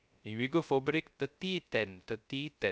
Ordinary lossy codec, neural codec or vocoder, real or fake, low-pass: none; codec, 16 kHz, 0.3 kbps, FocalCodec; fake; none